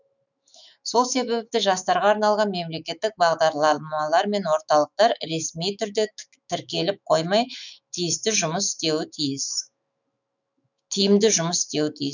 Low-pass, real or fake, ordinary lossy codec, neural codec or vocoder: 7.2 kHz; fake; none; autoencoder, 48 kHz, 128 numbers a frame, DAC-VAE, trained on Japanese speech